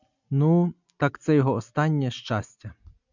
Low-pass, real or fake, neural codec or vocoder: 7.2 kHz; real; none